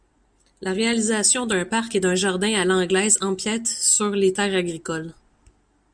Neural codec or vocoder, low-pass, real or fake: none; 9.9 kHz; real